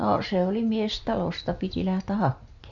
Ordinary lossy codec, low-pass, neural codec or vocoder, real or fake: none; 7.2 kHz; none; real